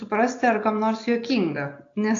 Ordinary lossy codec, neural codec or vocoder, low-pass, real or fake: AAC, 48 kbps; none; 7.2 kHz; real